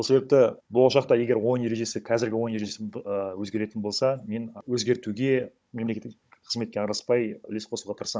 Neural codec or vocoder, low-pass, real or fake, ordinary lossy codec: codec, 16 kHz, 16 kbps, FunCodec, trained on Chinese and English, 50 frames a second; none; fake; none